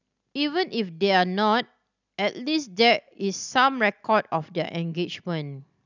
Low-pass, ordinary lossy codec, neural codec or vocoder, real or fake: 7.2 kHz; none; none; real